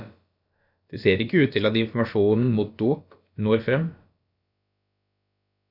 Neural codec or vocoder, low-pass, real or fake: codec, 16 kHz, about 1 kbps, DyCAST, with the encoder's durations; 5.4 kHz; fake